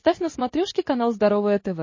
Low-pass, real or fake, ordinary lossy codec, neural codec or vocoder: 7.2 kHz; real; MP3, 32 kbps; none